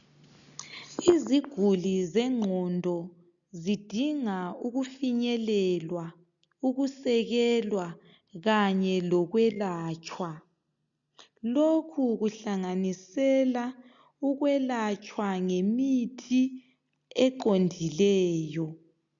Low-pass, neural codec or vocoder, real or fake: 7.2 kHz; none; real